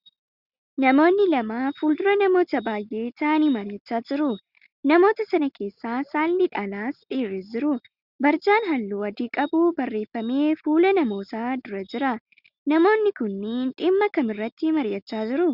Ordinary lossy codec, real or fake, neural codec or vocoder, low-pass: Opus, 64 kbps; real; none; 5.4 kHz